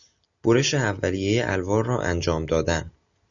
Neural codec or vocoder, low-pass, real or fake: none; 7.2 kHz; real